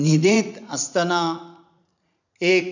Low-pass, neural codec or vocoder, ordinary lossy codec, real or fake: 7.2 kHz; vocoder, 44.1 kHz, 80 mel bands, Vocos; none; fake